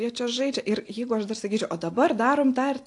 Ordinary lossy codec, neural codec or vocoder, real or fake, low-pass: AAC, 48 kbps; none; real; 10.8 kHz